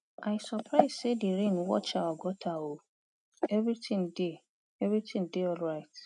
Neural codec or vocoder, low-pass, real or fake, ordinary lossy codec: none; 10.8 kHz; real; none